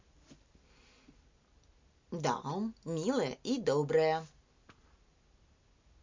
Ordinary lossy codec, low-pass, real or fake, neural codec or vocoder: MP3, 64 kbps; 7.2 kHz; real; none